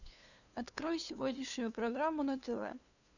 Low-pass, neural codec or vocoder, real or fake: 7.2 kHz; codec, 16 kHz, 2 kbps, FunCodec, trained on LibriTTS, 25 frames a second; fake